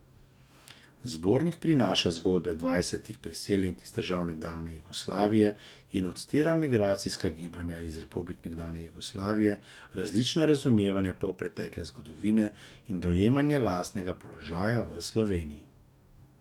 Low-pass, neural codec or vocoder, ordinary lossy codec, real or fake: 19.8 kHz; codec, 44.1 kHz, 2.6 kbps, DAC; none; fake